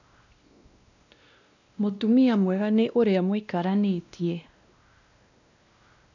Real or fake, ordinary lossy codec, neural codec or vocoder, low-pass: fake; none; codec, 16 kHz, 1 kbps, X-Codec, WavLM features, trained on Multilingual LibriSpeech; 7.2 kHz